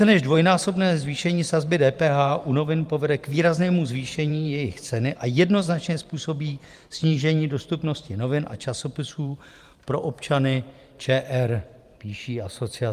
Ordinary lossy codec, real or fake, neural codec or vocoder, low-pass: Opus, 32 kbps; fake; vocoder, 44.1 kHz, 128 mel bands every 512 samples, BigVGAN v2; 14.4 kHz